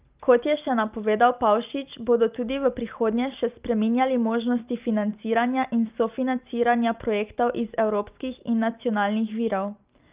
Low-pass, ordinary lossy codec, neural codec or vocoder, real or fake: 3.6 kHz; Opus, 24 kbps; none; real